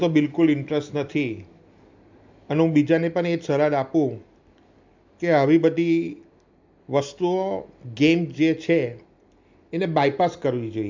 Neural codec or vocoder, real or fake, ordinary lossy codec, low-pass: none; real; MP3, 64 kbps; 7.2 kHz